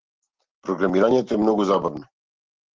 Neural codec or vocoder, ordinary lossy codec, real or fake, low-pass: none; Opus, 16 kbps; real; 7.2 kHz